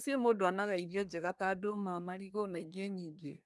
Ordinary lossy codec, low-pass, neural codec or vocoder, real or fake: none; none; codec, 24 kHz, 1 kbps, SNAC; fake